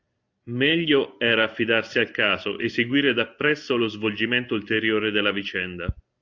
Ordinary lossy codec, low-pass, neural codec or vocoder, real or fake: AAC, 48 kbps; 7.2 kHz; none; real